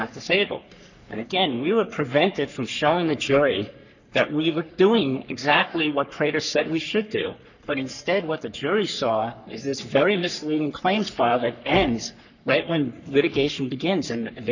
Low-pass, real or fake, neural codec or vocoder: 7.2 kHz; fake; codec, 44.1 kHz, 3.4 kbps, Pupu-Codec